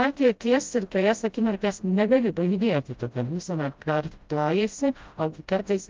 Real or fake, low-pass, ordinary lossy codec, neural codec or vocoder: fake; 7.2 kHz; Opus, 32 kbps; codec, 16 kHz, 0.5 kbps, FreqCodec, smaller model